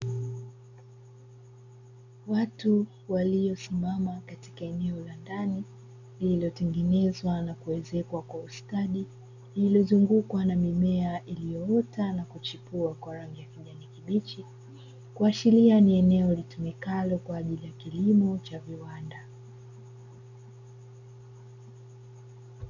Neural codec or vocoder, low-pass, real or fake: none; 7.2 kHz; real